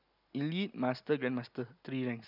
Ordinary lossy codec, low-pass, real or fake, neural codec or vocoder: none; 5.4 kHz; real; none